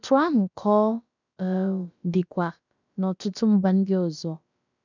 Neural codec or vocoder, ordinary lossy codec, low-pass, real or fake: codec, 16 kHz, about 1 kbps, DyCAST, with the encoder's durations; none; 7.2 kHz; fake